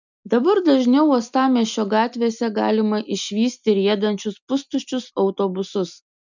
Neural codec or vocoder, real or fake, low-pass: none; real; 7.2 kHz